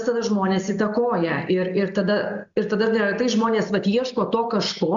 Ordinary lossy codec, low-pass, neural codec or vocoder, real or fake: MP3, 64 kbps; 7.2 kHz; none; real